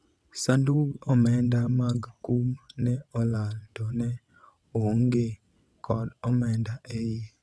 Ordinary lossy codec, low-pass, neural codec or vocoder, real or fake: none; none; vocoder, 22.05 kHz, 80 mel bands, WaveNeXt; fake